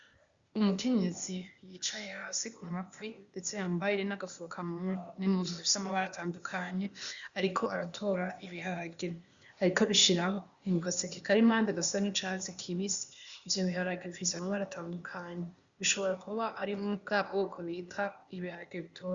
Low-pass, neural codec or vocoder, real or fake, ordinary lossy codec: 7.2 kHz; codec, 16 kHz, 0.8 kbps, ZipCodec; fake; Opus, 64 kbps